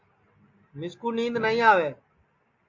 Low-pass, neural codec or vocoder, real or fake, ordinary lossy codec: 7.2 kHz; none; real; MP3, 48 kbps